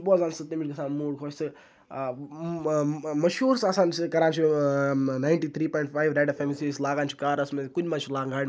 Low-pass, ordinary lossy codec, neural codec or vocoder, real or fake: none; none; none; real